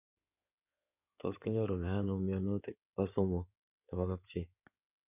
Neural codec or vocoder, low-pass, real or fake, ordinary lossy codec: codec, 16 kHz in and 24 kHz out, 2.2 kbps, FireRedTTS-2 codec; 3.6 kHz; fake; none